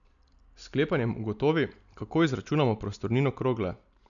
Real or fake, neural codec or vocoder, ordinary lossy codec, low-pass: real; none; none; 7.2 kHz